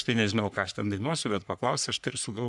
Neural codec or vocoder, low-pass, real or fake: codec, 44.1 kHz, 3.4 kbps, Pupu-Codec; 10.8 kHz; fake